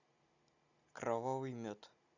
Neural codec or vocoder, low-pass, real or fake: none; 7.2 kHz; real